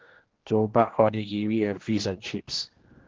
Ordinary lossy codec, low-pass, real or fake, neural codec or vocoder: Opus, 16 kbps; 7.2 kHz; fake; codec, 16 kHz, 1 kbps, X-Codec, HuBERT features, trained on general audio